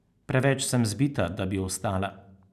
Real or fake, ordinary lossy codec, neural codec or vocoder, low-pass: real; none; none; 14.4 kHz